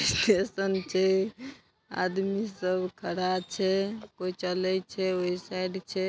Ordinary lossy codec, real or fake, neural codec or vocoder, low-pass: none; real; none; none